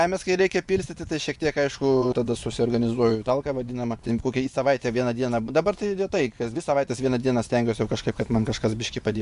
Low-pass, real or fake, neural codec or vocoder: 10.8 kHz; fake; vocoder, 24 kHz, 100 mel bands, Vocos